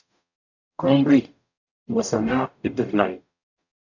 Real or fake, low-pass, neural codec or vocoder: fake; 7.2 kHz; codec, 44.1 kHz, 0.9 kbps, DAC